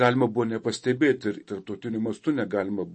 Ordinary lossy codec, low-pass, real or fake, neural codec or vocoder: MP3, 32 kbps; 10.8 kHz; real; none